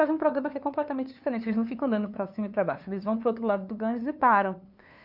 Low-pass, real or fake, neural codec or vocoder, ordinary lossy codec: 5.4 kHz; fake; codec, 16 kHz, 2 kbps, FunCodec, trained on LibriTTS, 25 frames a second; none